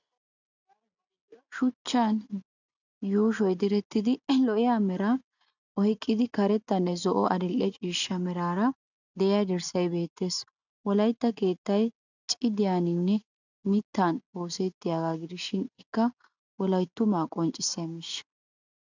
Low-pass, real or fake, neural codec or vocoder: 7.2 kHz; real; none